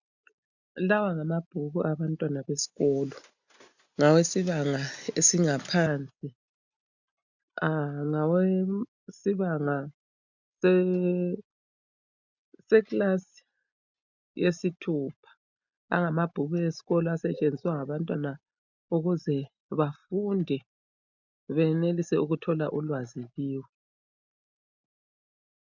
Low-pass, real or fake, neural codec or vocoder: 7.2 kHz; real; none